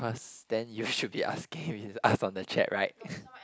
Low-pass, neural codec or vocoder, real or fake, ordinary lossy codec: none; none; real; none